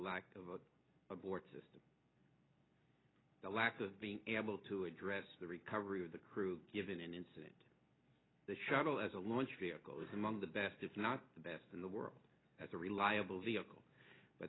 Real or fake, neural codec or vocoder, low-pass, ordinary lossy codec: real; none; 7.2 kHz; AAC, 16 kbps